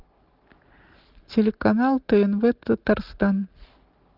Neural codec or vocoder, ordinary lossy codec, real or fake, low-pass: none; Opus, 16 kbps; real; 5.4 kHz